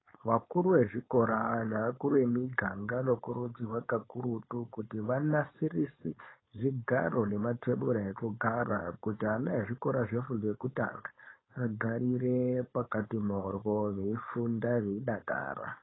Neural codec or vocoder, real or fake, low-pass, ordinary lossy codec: codec, 16 kHz, 4.8 kbps, FACodec; fake; 7.2 kHz; AAC, 16 kbps